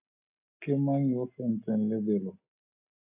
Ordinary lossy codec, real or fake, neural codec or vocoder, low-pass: AAC, 24 kbps; real; none; 3.6 kHz